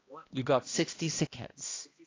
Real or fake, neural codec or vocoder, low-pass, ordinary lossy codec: fake; codec, 16 kHz, 1 kbps, X-Codec, HuBERT features, trained on balanced general audio; 7.2 kHz; AAC, 32 kbps